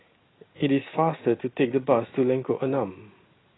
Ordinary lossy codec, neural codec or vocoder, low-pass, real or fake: AAC, 16 kbps; none; 7.2 kHz; real